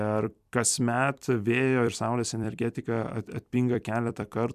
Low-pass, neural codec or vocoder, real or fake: 14.4 kHz; vocoder, 44.1 kHz, 128 mel bands every 256 samples, BigVGAN v2; fake